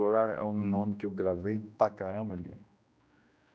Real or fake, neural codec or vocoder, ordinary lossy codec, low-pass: fake; codec, 16 kHz, 1 kbps, X-Codec, HuBERT features, trained on general audio; none; none